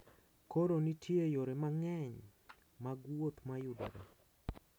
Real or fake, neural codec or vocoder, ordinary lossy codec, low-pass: real; none; none; none